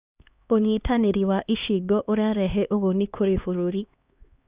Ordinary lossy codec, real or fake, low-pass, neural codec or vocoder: Opus, 64 kbps; fake; 3.6 kHz; codec, 16 kHz, 2 kbps, X-Codec, HuBERT features, trained on LibriSpeech